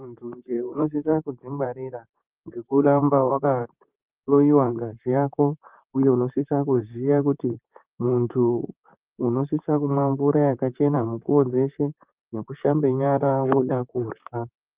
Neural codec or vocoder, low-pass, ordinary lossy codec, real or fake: vocoder, 44.1 kHz, 128 mel bands, Pupu-Vocoder; 3.6 kHz; Opus, 24 kbps; fake